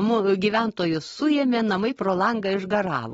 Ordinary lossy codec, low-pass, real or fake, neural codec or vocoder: AAC, 24 kbps; 19.8 kHz; real; none